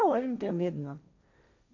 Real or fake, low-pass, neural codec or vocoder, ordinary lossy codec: fake; 7.2 kHz; codec, 16 kHz, 1.1 kbps, Voila-Tokenizer; none